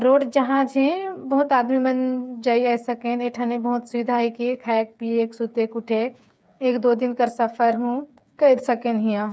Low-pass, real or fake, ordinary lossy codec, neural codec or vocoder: none; fake; none; codec, 16 kHz, 8 kbps, FreqCodec, smaller model